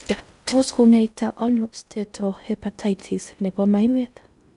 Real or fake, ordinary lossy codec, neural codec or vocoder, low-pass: fake; none; codec, 16 kHz in and 24 kHz out, 0.6 kbps, FocalCodec, streaming, 4096 codes; 10.8 kHz